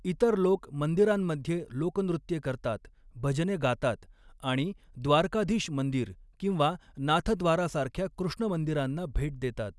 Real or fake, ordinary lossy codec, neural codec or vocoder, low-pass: real; none; none; none